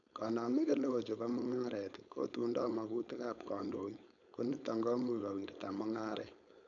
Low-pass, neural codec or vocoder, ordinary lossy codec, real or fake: 7.2 kHz; codec, 16 kHz, 4.8 kbps, FACodec; none; fake